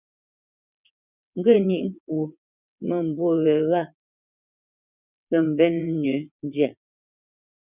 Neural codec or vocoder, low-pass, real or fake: vocoder, 22.05 kHz, 80 mel bands, WaveNeXt; 3.6 kHz; fake